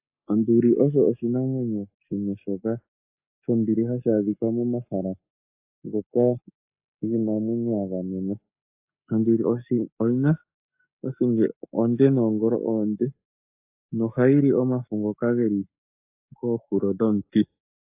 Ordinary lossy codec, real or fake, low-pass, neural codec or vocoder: AAC, 32 kbps; fake; 3.6 kHz; codec, 44.1 kHz, 7.8 kbps, Pupu-Codec